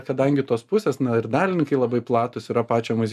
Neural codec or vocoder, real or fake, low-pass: none; real; 14.4 kHz